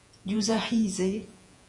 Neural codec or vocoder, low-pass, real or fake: vocoder, 48 kHz, 128 mel bands, Vocos; 10.8 kHz; fake